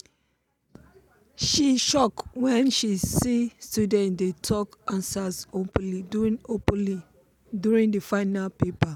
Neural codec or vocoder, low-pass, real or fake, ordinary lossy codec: none; 19.8 kHz; real; none